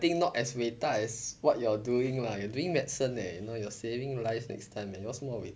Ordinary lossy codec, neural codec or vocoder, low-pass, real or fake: none; none; none; real